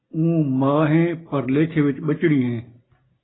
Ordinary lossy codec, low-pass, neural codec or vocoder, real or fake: AAC, 16 kbps; 7.2 kHz; none; real